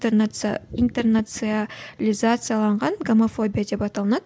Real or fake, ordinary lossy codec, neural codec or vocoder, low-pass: real; none; none; none